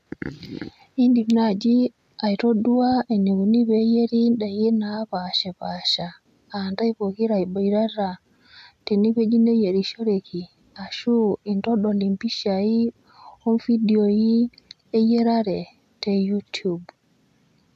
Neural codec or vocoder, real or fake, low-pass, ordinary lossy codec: none; real; 14.4 kHz; none